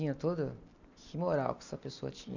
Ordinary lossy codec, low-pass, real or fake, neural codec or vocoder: none; 7.2 kHz; real; none